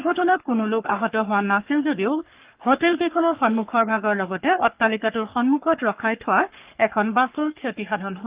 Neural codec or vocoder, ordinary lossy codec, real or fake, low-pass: codec, 44.1 kHz, 3.4 kbps, Pupu-Codec; Opus, 32 kbps; fake; 3.6 kHz